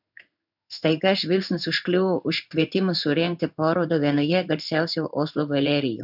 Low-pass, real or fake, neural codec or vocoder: 5.4 kHz; fake; codec, 16 kHz in and 24 kHz out, 1 kbps, XY-Tokenizer